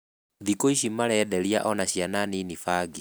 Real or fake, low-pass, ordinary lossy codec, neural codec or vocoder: real; none; none; none